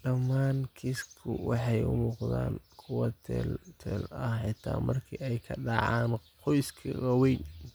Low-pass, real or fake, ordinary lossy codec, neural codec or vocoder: none; real; none; none